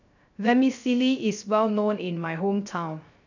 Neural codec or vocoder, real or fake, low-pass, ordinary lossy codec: codec, 16 kHz, 0.3 kbps, FocalCodec; fake; 7.2 kHz; none